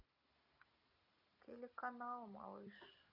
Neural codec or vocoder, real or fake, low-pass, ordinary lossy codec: none; real; 5.4 kHz; AAC, 48 kbps